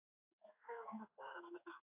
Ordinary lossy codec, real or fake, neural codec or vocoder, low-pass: AAC, 32 kbps; fake; codec, 16 kHz in and 24 kHz out, 1 kbps, XY-Tokenizer; 3.6 kHz